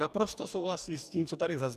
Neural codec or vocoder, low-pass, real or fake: codec, 44.1 kHz, 2.6 kbps, DAC; 14.4 kHz; fake